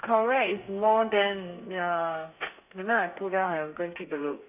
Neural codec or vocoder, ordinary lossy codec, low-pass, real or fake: codec, 32 kHz, 1.9 kbps, SNAC; none; 3.6 kHz; fake